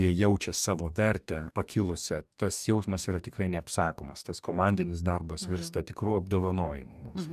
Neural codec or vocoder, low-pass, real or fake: codec, 44.1 kHz, 2.6 kbps, DAC; 14.4 kHz; fake